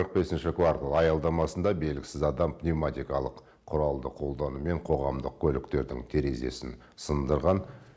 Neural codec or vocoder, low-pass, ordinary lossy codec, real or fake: none; none; none; real